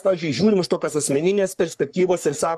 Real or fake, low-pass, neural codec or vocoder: fake; 14.4 kHz; codec, 44.1 kHz, 3.4 kbps, Pupu-Codec